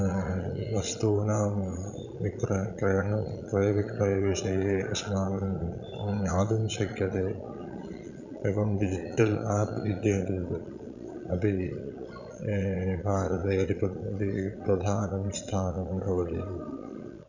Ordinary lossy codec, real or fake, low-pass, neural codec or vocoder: none; fake; 7.2 kHz; vocoder, 22.05 kHz, 80 mel bands, Vocos